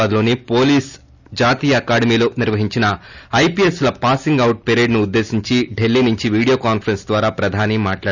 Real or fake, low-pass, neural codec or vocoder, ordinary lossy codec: real; 7.2 kHz; none; none